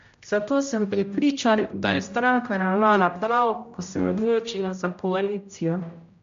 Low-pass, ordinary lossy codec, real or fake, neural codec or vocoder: 7.2 kHz; MP3, 48 kbps; fake; codec, 16 kHz, 0.5 kbps, X-Codec, HuBERT features, trained on general audio